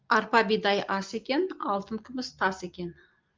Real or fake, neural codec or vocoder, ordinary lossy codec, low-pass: real; none; Opus, 32 kbps; 7.2 kHz